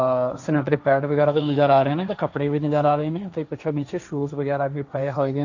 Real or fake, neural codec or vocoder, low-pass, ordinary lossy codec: fake; codec, 16 kHz, 1.1 kbps, Voila-Tokenizer; 7.2 kHz; none